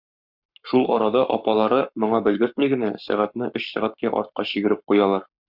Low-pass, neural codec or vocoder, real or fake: 5.4 kHz; codec, 44.1 kHz, 7.8 kbps, Pupu-Codec; fake